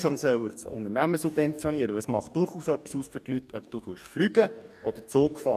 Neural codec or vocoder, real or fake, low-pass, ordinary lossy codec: codec, 44.1 kHz, 2.6 kbps, DAC; fake; 14.4 kHz; none